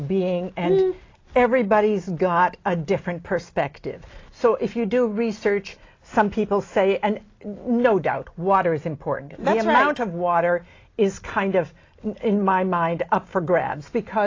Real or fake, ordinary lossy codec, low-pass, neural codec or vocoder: real; AAC, 32 kbps; 7.2 kHz; none